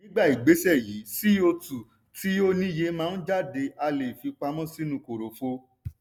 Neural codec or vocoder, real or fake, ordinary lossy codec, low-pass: none; real; none; none